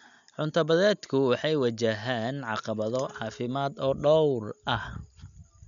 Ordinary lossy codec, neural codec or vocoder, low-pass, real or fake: none; none; 7.2 kHz; real